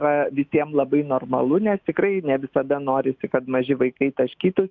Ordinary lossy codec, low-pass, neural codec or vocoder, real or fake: Opus, 24 kbps; 7.2 kHz; autoencoder, 48 kHz, 128 numbers a frame, DAC-VAE, trained on Japanese speech; fake